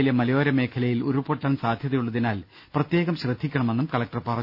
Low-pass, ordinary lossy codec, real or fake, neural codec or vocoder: 5.4 kHz; none; real; none